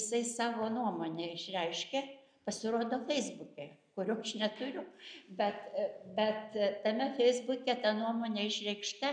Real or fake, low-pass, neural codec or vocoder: real; 9.9 kHz; none